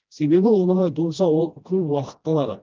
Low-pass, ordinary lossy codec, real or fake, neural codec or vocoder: 7.2 kHz; Opus, 24 kbps; fake; codec, 16 kHz, 1 kbps, FreqCodec, smaller model